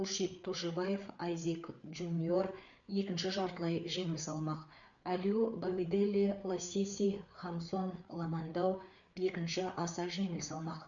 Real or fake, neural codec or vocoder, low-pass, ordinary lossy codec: fake; codec, 16 kHz, 4 kbps, FreqCodec, larger model; 7.2 kHz; none